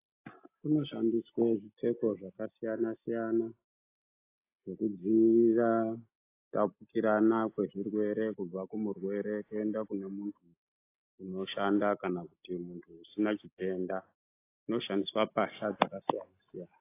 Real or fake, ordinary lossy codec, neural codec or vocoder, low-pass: real; AAC, 24 kbps; none; 3.6 kHz